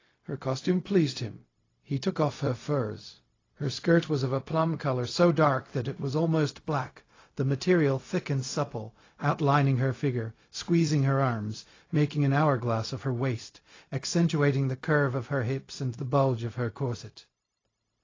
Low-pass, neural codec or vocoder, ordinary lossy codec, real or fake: 7.2 kHz; codec, 16 kHz, 0.4 kbps, LongCat-Audio-Codec; AAC, 32 kbps; fake